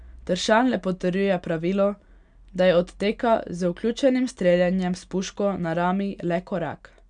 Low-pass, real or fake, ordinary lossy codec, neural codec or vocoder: 9.9 kHz; real; none; none